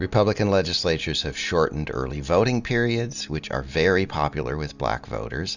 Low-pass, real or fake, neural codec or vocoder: 7.2 kHz; real; none